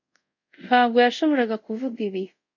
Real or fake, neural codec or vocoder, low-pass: fake; codec, 24 kHz, 0.5 kbps, DualCodec; 7.2 kHz